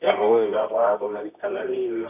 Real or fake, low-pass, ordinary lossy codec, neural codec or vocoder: fake; 3.6 kHz; none; codec, 24 kHz, 0.9 kbps, WavTokenizer, medium music audio release